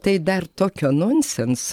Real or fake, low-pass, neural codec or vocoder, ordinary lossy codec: fake; 19.8 kHz; vocoder, 44.1 kHz, 128 mel bands every 512 samples, BigVGAN v2; MP3, 96 kbps